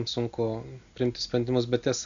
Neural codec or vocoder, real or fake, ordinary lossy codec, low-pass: none; real; MP3, 64 kbps; 7.2 kHz